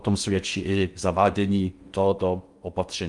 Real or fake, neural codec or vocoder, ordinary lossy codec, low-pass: fake; codec, 16 kHz in and 24 kHz out, 0.6 kbps, FocalCodec, streaming, 4096 codes; Opus, 32 kbps; 10.8 kHz